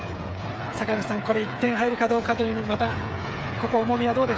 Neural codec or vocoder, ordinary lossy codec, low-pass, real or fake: codec, 16 kHz, 8 kbps, FreqCodec, smaller model; none; none; fake